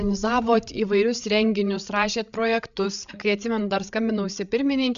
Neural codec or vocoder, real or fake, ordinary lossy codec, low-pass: codec, 16 kHz, 8 kbps, FreqCodec, larger model; fake; MP3, 96 kbps; 7.2 kHz